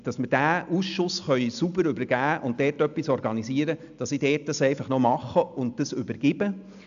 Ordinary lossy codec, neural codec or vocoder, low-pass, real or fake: AAC, 96 kbps; none; 7.2 kHz; real